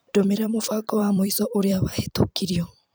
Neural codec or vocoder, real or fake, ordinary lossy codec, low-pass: vocoder, 44.1 kHz, 128 mel bands every 256 samples, BigVGAN v2; fake; none; none